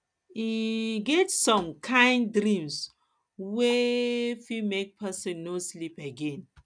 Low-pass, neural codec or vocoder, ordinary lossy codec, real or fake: 9.9 kHz; none; none; real